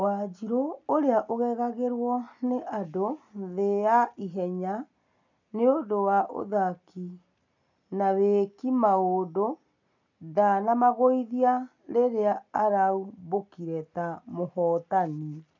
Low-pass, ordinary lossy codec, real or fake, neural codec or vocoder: 7.2 kHz; none; real; none